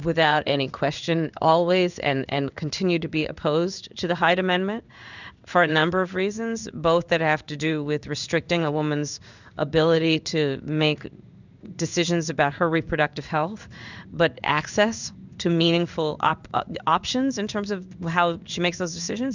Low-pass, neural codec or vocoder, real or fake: 7.2 kHz; codec, 16 kHz in and 24 kHz out, 1 kbps, XY-Tokenizer; fake